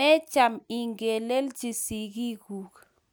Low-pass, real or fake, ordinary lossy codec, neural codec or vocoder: none; real; none; none